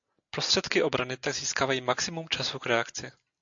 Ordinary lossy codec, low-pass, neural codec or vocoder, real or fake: AAC, 64 kbps; 7.2 kHz; none; real